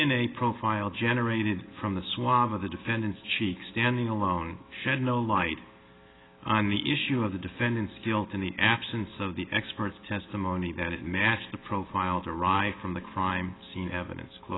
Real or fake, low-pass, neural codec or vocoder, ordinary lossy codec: real; 7.2 kHz; none; AAC, 16 kbps